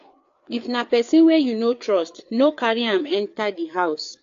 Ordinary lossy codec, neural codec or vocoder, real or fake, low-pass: AAC, 48 kbps; codec, 16 kHz, 4 kbps, FreqCodec, larger model; fake; 7.2 kHz